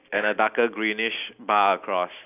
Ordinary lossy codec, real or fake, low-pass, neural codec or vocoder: none; real; 3.6 kHz; none